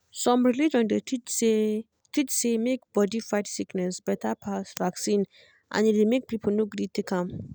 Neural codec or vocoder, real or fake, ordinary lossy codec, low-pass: none; real; none; none